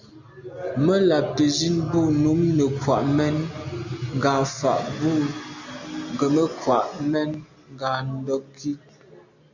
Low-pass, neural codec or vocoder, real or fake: 7.2 kHz; none; real